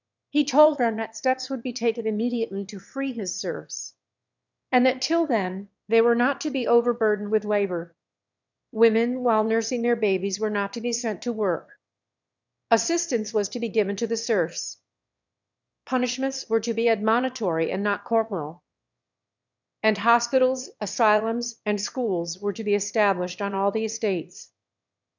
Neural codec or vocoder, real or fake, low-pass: autoencoder, 22.05 kHz, a latent of 192 numbers a frame, VITS, trained on one speaker; fake; 7.2 kHz